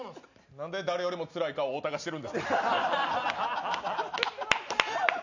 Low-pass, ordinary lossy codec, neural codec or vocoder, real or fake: 7.2 kHz; none; none; real